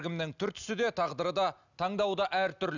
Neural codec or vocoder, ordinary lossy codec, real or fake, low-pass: none; none; real; 7.2 kHz